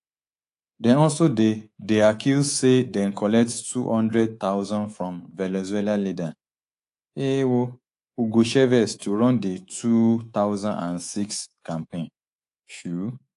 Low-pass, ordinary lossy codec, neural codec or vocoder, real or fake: 10.8 kHz; AAC, 48 kbps; codec, 24 kHz, 3.1 kbps, DualCodec; fake